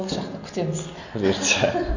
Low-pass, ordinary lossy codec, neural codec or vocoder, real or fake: 7.2 kHz; none; none; real